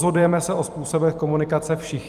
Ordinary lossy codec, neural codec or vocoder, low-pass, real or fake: Opus, 32 kbps; vocoder, 44.1 kHz, 128 mel bands every 256 samples, BigVGAN v2; 14.4 kHz; fake